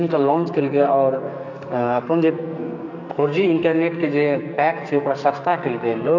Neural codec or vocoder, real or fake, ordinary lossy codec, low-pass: codec, 44.1 kHz, 2.6 kbps, SNAC; fake; none; 7.2 kHz